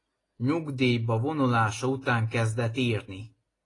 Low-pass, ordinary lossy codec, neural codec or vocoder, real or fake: 10.8 kHz; AAC, 32 kbps; none; real